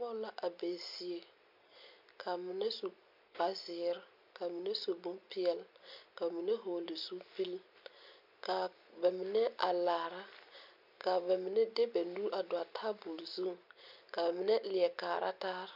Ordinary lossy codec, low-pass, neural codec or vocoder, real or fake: MP3, 48 kbps; 5.4 kHz; none; real